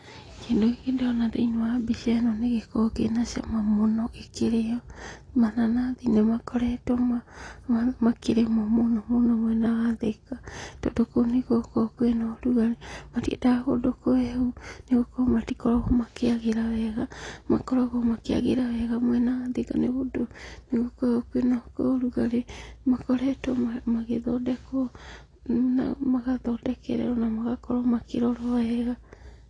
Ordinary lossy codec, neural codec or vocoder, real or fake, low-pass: AAC, 32 kbps; none; real; 9.9 kHz